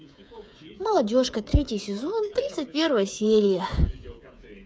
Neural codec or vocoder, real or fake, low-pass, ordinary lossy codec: codec, 16 kHz, 8 kbps, FreqCodec, smaller model; fake; none; none